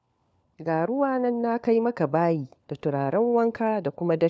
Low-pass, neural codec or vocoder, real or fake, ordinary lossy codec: none; codec, 16 kHz, 4 kbps, FunCodec, trained on LibriTTS, 50 frames a second; fake; none